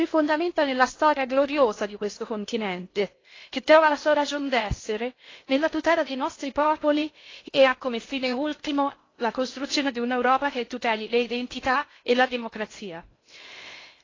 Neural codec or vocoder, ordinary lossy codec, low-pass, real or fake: codec, 16 kHz in and 24 kHz out, 0.6 kbps, FocalCodec, streaming, 2048 codes; AAC, 32 kbps; 7.2 kHz; fake